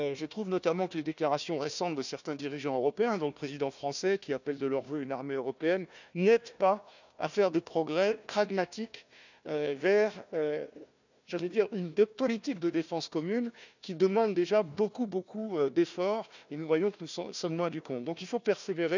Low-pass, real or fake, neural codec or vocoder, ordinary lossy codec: 7.2 kHz; fake; codec, 16 kHz, 1 kbps, FunCodec, trained on Chinese and English, 50 frames a second; none